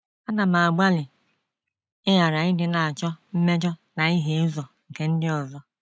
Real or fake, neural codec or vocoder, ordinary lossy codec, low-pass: real; none; none; none